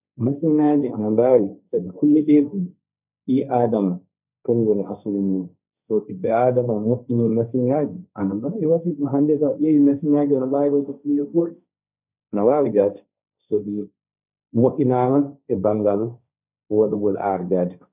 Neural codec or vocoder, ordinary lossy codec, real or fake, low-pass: codec, 16 kHz, 1.1 kbps, Voila-Tokenizer; none; fake; 3.6 kHz